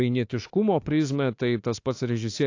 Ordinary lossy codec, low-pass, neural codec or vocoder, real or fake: AAC, 48 kbps; 7.2 kHz; autoencoder, 48 kHz, 32 numbers a frame, DAC-VAE, trained on Japanese speech; fake